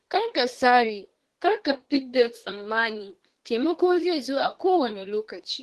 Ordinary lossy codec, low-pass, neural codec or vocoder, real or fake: Opus, 16 kbps; 10.8 kHz; codec, 24 kHz, 1 kbps, SNAC; fake